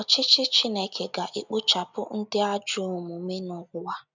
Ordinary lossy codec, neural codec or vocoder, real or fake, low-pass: none; none; real; 7.2 kHz